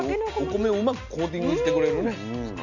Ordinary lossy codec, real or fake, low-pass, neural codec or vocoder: none; real; 7.2 kHz; none